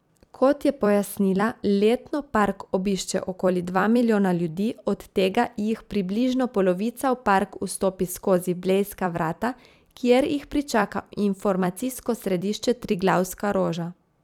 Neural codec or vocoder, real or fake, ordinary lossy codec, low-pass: vocoder, 44.1 kHz, 128 mel bands every 256 samples, BigVGAN v2; fake; none; 19.8 kHz